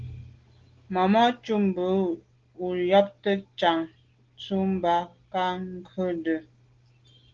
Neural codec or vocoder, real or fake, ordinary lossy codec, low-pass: none; real; Opus, 16 kbps; 7.2 kHz